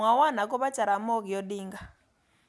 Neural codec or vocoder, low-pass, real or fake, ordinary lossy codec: none; none; real; none